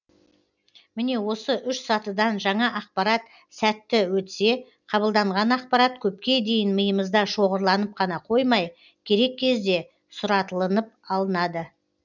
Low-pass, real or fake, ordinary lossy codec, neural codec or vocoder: 7.2 kHz; real; none; none